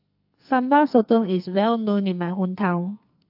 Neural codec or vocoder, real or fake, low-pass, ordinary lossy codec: codec, 44.1 kHz, 2.6 kbps, SNAC; fake; 5.4 kHz; AAC, 48 kbps